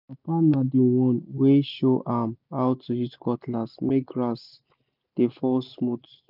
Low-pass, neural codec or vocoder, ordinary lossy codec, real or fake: 5.4 kHz; none; none; real